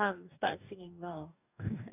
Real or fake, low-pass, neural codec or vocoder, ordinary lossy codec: fake; 3.6 kHz; codec, 44.1 kHz, 2.6 kbps, DAC; none